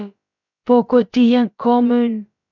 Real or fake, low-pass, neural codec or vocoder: fake; 7.2 kHz; codec, 16 kHz, about 1 kbps, DyCAST, with the encoder's durations